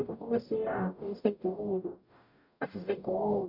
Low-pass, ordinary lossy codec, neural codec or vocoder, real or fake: 5.4 kHz; none; codec, 44.1 kHz, 0.9 kbps, DAC; fake